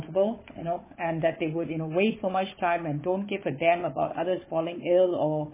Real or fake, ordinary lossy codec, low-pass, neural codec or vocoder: fake; MP3, 16 kbps; 3.6 kHz; codec, 16 kHz, 4 kbps, FunCodec, trained on Chinese and English, 50 frames a second